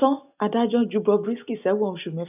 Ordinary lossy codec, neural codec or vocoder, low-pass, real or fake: none; none; 3.6 kHz; real